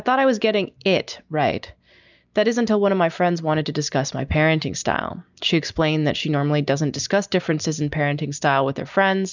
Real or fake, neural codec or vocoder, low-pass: real; none; 7.2 kHz